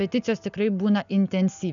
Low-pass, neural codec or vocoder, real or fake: 7.2 kHz; none; real